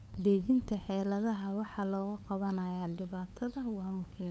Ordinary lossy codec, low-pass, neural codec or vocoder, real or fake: none; none; codec, 16 kHz, 8 kbps, FunCodec, trained on LibriTTS, 25 frames a second; fake